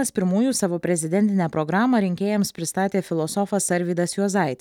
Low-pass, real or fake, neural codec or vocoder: 19.8 kHz; real; none